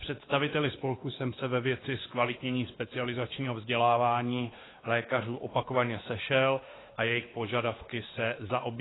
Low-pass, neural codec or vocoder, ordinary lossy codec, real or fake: 7.2 kHz; codec, 16 kHz, 2 kbps, X-Codec, WavLM features, trained on Multilingual LibriSpeech; AAC, 16 kbps; fake